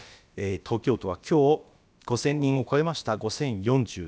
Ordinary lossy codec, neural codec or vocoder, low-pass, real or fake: none; codec, 16 kHz, about 1 kbps, DyCAST, with the encoder's durations; none; fake